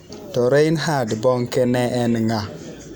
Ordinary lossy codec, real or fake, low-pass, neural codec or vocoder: none; real; none; none